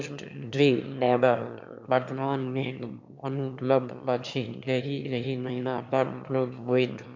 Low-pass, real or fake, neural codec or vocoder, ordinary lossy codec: 7.2 kHz; fake; autoencoder, 22.05 kHz, a latent of 192 numbers a frame, VITS, trained on one speaker; MP3, 64 kbps